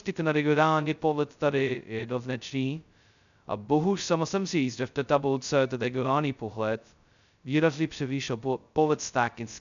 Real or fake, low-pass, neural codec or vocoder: fake; 7.2 kHz; codec, 16 kHz, 0.2 kbps, FocalCodec